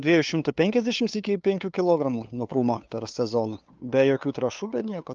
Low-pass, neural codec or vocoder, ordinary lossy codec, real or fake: 7.2 kHz; codec, 16 kHz, 2 kbps, FunCodec, trained on LibriTTS, 25 frames a second; Opus, 24 kbps; fake